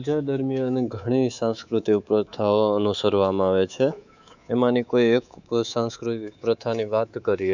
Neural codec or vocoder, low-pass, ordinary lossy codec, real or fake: codec, 24 kHz, 3.1 kbps, DualCodec; 7.2 kHz; none; fake